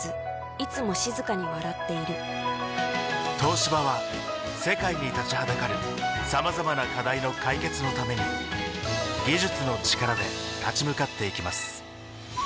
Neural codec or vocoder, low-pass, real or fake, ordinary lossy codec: none; none; real; none